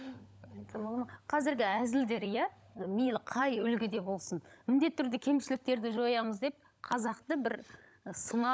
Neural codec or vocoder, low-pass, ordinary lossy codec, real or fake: codec, 16 kHz, 16 kbps, FunCodec, trained on LibriTTS, 50 frames a second; none; none; fake